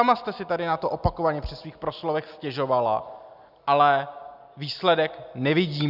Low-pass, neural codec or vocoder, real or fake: 5.4 kHz; none; real